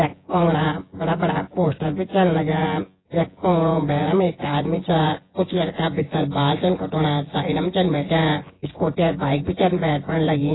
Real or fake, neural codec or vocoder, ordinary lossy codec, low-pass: fake; vocoder, 24 kHz, 100 mel bands, Vocos; AAC, 16 kbps; 7.2 kHz